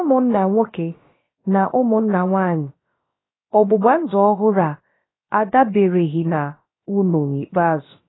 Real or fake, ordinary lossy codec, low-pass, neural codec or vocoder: fake; AAC, 16 kbps; 7.2 kHz; codec, 16 kHz, about 1 kbps, DyCAST, with the encoder's durations